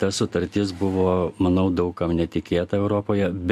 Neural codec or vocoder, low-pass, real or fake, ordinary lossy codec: none; 14.4 kHz; real; MP3, 64 kbps